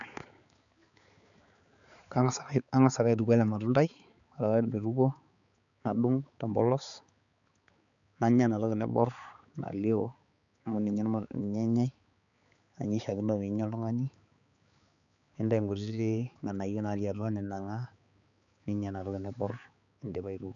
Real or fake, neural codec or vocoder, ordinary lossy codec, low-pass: fake; codec, 16 kHz, 4 kbps, X-Codec, HuBERT features, trained on balanced general audio; none; 7.2 kHz